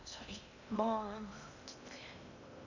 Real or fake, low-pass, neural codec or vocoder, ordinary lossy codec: fake; 7.2 kHz; codec, 16 kHz in and 24 kHz out, 0.8 kbps, FocalCodec, streaming, 65536 codes; none